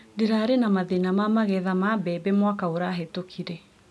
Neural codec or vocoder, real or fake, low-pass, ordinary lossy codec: none; real; none; none